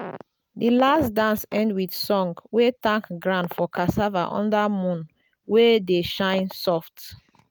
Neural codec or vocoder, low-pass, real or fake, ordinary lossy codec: none; none; real; none